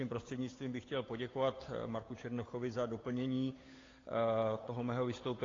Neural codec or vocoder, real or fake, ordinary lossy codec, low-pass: codec, 16 kHz, 8 kbps, FunCodec, trained on Chinese and English, 25 frames a second; fake; AAC, 32 kbps; 7.2 kHz